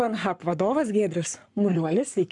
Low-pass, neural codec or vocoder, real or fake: 10.8 kHz; codec, 44.1 kHz, 7.8 kbps, Pupu-Codec; fake